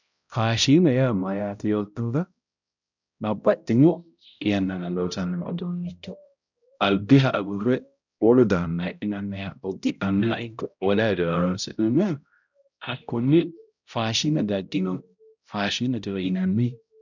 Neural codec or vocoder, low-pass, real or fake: codec, 16 kHz, 0.5 kbps, X-Codec, HuBERT features, trained on balanced general audio; 7.2 kHz; fake